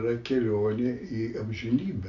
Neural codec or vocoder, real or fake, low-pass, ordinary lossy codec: none; real; 7.2 kHz; AAC, 64 kbps